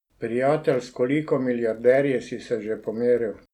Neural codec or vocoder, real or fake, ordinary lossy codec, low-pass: none; real; none; 19.8 kHz